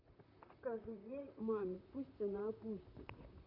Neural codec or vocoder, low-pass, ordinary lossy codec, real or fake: vocoder, 44.1 kHz, 128 mel bands, Pupu-Vocoder; 5.4 kHz; none; fake